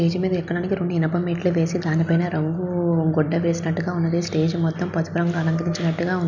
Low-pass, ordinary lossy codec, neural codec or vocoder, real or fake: 7.2 kHz; none; none; real